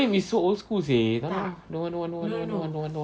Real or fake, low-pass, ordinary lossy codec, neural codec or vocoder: real; none; none; none